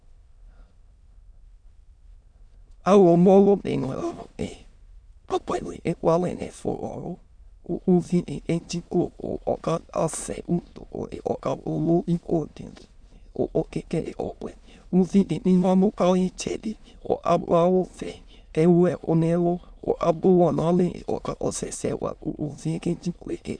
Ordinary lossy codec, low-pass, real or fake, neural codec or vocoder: Opus, 64 kbps; 9.9 kHz; fake; autoencoder, 22.05 kHz, a latent of 192 numbers a frame, VITS, trained on many speakers